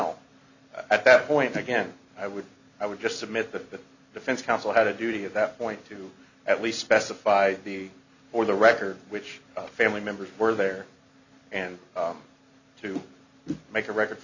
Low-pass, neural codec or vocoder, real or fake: 7.2 kHz; none; real